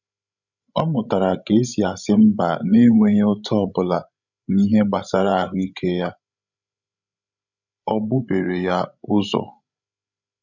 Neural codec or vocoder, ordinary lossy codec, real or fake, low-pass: codec, 16 kHz, 16 kbps, FreqCodec, larger model; none; fake; 7.2 kHz